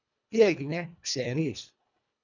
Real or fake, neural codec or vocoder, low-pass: fake; codec, 24 kHz, 1.5 kbps, HILCodec; 7.2 kHz